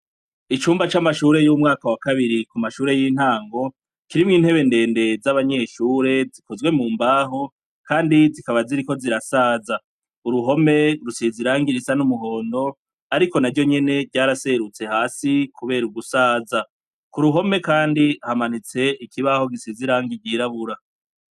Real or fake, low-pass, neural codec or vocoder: real; 14.4 kHz; none